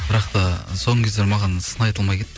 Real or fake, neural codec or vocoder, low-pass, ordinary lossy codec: real; none; none; none